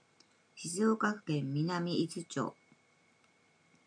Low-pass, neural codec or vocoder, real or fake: 9.9 kHz; none; real